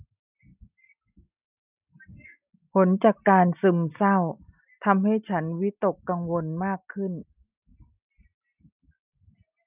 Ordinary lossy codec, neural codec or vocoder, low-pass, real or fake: none; none; 3.6 kHz; real